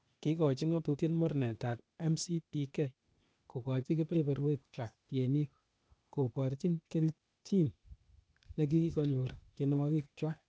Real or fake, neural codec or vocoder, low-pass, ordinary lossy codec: fake; codec, 16 kHz, 0.8 kbps, ZipCodec; none; none